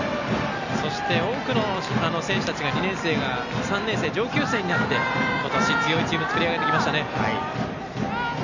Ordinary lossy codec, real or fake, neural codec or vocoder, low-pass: none; real; none; 7.2 kHz